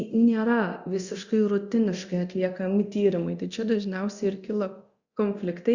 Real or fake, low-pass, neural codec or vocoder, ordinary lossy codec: fake; 7.2 kHz; codec, 24 kHz, 0.9 kbps, DualCodec; Opus, 64 kbps